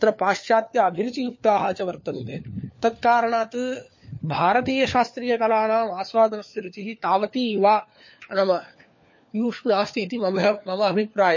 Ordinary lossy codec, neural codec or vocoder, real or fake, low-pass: MP3, 32 kbps; codec, 16 kHz, 4 kbps, FunCodec, trained on LibriTTS, 50 frames a second; fake; 7.2 kHz